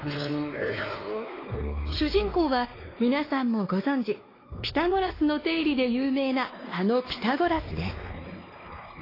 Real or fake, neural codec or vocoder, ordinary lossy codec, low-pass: fake; codec, 16 kHz, 2 kbps, X-Codec, WavLM features, trained on Multilingual LibriSpeech; AAC, 24 kbps; 5.4 kHz